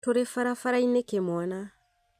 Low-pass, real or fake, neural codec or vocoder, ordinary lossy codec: 14.4 kHz; real; none; MP3, 96 kbps